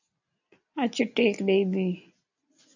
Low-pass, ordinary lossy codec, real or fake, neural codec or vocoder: 7.2 kHz; AAC, 48 kbps; real; none